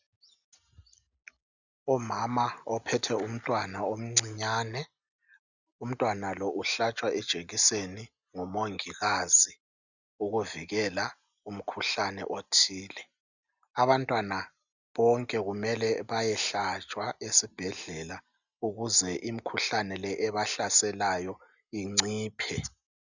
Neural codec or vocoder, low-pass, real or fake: none; 7.2 kHz; real